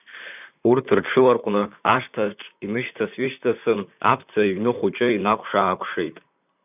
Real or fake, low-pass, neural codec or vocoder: fake; 3.6 kHz; vocoder, 44.1 kHz, 128 mel bands, Pupu-Vocoder